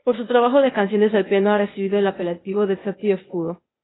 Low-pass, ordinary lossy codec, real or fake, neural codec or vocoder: 7.2 kHz; AAC, 16 kbps; fake; codec, 16 kHz, 0.8 kbps, ZipCodec